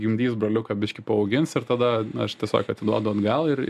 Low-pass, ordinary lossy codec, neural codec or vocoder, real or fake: 14.4 kHz; MP3, 96 kbps; none; real